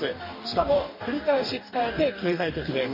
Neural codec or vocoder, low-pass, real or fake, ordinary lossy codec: codec, 44.1 kHz, 2.6 kbps, DAC; 5.4 kHz; fake; MP3, 24 kbps